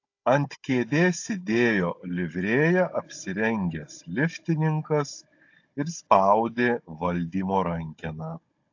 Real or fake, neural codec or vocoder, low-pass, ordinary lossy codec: fake; codec, 16 kHz, 16 kbps, FunCodec, trained on Chinese and English, 50 frames a second; 7.2 kHz; AAC, 48 kbps